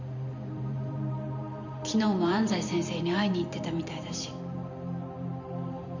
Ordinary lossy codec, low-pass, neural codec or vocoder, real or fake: none; 7.2 kHz; vocoder, 44.1 kHz, 128 mel bands every 512 samples, BigVGAN v2; fake